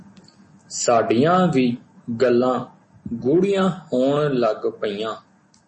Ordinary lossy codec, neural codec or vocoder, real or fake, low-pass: MP3, 32 kbps; none; real; 10.8 kHz